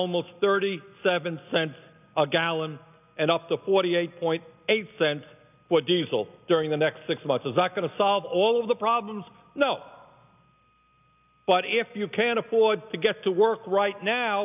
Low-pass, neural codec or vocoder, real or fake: 3.6 kHz; none; real